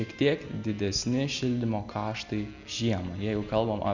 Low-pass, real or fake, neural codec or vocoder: 7.2 kHz; real; none